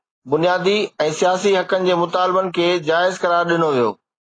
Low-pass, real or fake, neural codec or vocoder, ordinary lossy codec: 9.9 kHz; real; none; AAC, 32 kbps